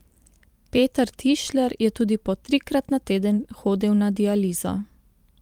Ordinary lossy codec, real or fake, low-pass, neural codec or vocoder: Opus, 32 kbps; fake; 19.8 kHz; vocoder, 44.1 kHz, 128 mel bands every 512 samples, BigVGAN v2